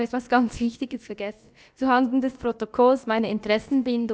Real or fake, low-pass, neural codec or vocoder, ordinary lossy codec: fake; none; codec, 16 kHz, about 1 kbps, DyCAST, with the encoder's durations; none